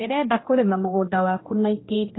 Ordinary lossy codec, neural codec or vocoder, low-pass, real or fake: AAC, 16 kbps; codec, 16 kHz, 1 kbps, X-Codec, HuBERT features, trained on general audio; 7.2 kHz; fake